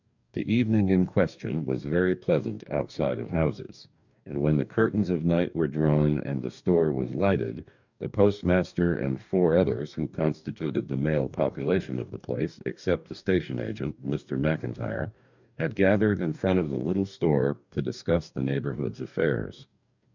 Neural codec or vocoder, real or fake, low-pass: codec, 44.1 kHz, 2.6 kbps, DAC; fake; 7.2 kHz